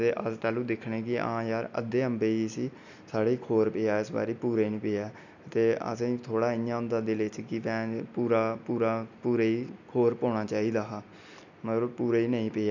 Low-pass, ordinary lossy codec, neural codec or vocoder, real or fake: 7.2 kHz; none; none; real